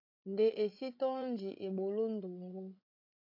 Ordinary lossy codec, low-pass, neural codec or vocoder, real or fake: AAC, 48 kbps; 5.4 kHz; codec, 16 kHz, 16 kbps, FreqCodec, larger model; fake